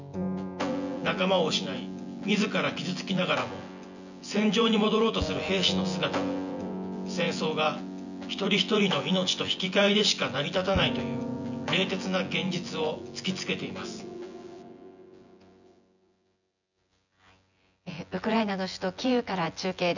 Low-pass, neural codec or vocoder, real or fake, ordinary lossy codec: 7.2 kHz; vocoder, 24 kHz, 100 mel bands, Vocos; fake; none